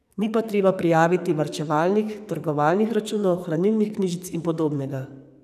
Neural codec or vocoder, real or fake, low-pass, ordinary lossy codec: codec, 44.1 kHz, 2.6 kbps, SNAC; fake; 14.4 kHz; none